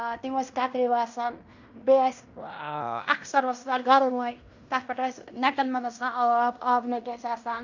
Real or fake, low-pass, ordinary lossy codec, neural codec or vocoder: fake; 7.2 kHz; Opus, 64 kbps; codec, 16 kHz in and 24 kHz out, 0.9 kbps, LongCat-Audio-Codec, fine tuned four codebook decoder